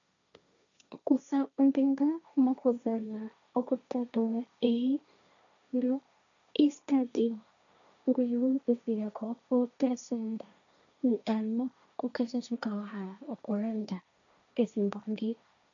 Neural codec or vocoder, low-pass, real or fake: codec, 16 kHz, 1.1 kbps, Voila-Tokenizer; 7.2 kHz; fake